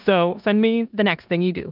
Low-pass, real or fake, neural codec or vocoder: 5.4 kHz; fake; codec, 16 kHz in and 24 kHz out, 0.9 kbps, LongCat-Audio-Codec, four codebook decoder